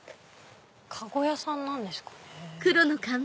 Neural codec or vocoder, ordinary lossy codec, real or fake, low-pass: none; none; real; none